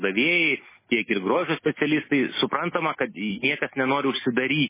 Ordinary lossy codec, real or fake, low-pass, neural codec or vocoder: MP3, 16 kbps; real; 3.6 kHz; none